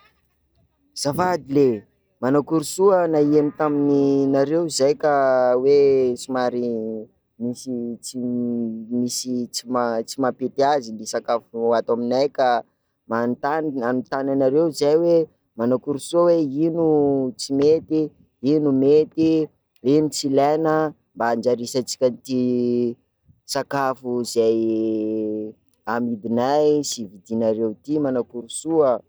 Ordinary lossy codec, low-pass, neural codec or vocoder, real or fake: none; none; none; real